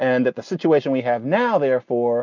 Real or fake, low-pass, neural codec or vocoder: real; 7.2 kHz; none